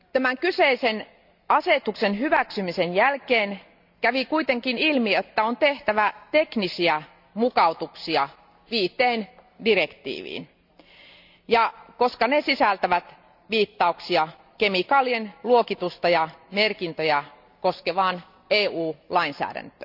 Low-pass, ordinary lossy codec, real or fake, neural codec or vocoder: 5.4 kHz; none; real; none